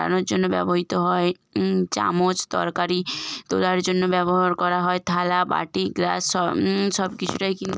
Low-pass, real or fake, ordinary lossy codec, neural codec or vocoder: none; real; none; none